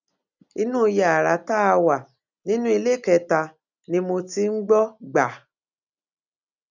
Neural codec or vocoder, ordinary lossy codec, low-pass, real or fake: none; none; 7.2 kHz; real